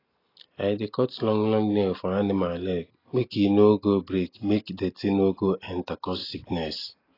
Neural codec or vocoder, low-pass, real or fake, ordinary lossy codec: none; 5.4 kHz; real; AAC, 24 kbps